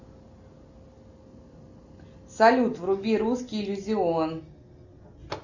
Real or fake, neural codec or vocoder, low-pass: real; none; 7.2 kHz